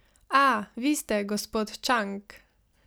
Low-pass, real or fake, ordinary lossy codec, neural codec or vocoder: none; real; none; none